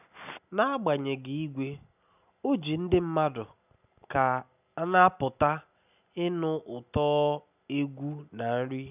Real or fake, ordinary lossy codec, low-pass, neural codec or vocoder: real; none; 3.6 kHz; none